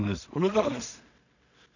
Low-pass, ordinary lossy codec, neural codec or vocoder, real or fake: 7.2 kHz; none; codec, 16 kHz in and 24 kHz out, 0.4 kbps, LongCat-Audio-Codec, two codebook decoder; fake